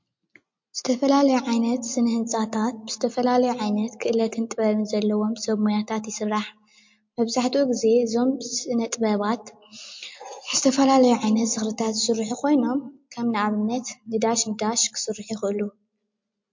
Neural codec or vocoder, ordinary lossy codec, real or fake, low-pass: none; MP3, 48 kbps; real; 7.2 kHz